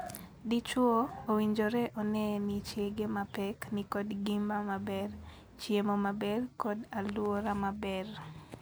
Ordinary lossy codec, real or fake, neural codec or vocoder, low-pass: none; real; none; none